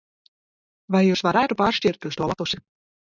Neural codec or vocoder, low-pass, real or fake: none; 7.2 kHz; real